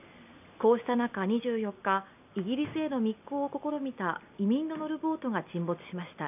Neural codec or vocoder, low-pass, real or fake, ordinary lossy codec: none; 3.6 kHz; real; none